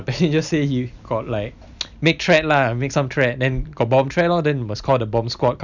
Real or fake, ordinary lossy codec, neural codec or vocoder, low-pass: real; none; none; 7.2 kHz